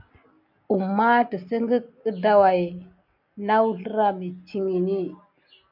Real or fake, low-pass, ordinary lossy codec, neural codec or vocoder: real; 5.4 kHz; MP3, 48 kbps; none